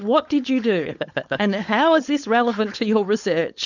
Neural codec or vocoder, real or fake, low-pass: codec, 16 kHz, 4.8 kbps, FACodec; fake; 7.2 kHz